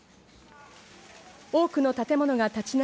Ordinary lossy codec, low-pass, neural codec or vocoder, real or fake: none; none; none; real